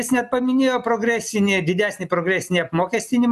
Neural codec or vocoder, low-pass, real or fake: none; 14.4 kHz; real